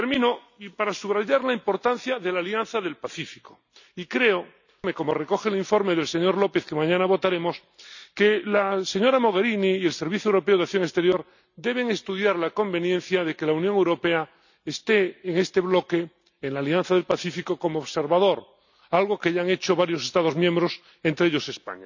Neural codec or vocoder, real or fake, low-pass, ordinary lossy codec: none; real; 7.2 kHz; none